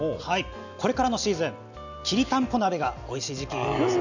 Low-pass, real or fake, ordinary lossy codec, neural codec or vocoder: 7.2 kHz; real; none; none